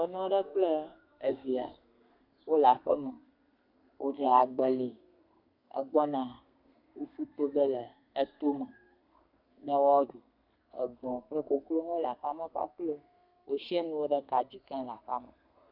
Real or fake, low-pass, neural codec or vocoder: fake; 5.4 kHz; codec, 32 kHz, 1.9 kbps, SNAC